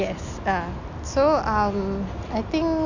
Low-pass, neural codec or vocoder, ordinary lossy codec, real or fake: 7.2 kHz; none; none; real